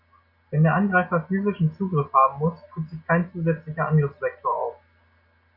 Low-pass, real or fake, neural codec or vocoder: 5.4 kHz; real; none